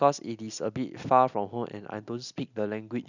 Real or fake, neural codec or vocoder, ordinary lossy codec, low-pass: real; none; none; 7.2 kHz